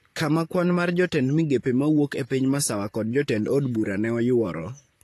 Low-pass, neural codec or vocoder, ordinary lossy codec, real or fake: 14.4 kHz; vocoder, 44.1 kHz, 128 mel bands, Pupu-Vocoder; AAC, 48 kbps; fake